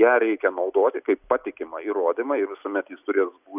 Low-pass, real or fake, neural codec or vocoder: 3.6 kHz; real; none